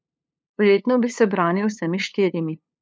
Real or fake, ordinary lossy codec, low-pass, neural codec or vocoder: fake; none; none; codec, 16 kHz, 2 kbps, FunCodec, trained on LibriTTS, 25 frames a second